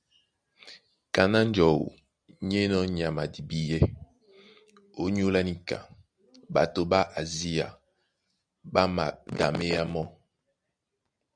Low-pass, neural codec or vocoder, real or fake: 9.9 kHz; none; real